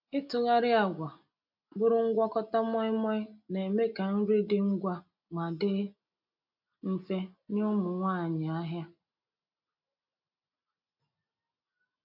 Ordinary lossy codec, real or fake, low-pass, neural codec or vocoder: none; real; 5.4 kHz; none